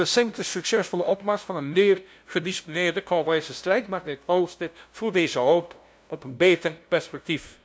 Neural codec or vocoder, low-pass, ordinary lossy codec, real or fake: codec, 16 kHz, 0.5 kbps, FunCodec, trained on LibriTTS, 25 frames a second; none; none; fake